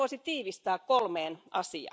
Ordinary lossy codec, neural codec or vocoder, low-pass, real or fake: none; none; none; real